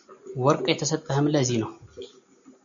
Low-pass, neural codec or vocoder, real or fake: 7.2 kHz; none; real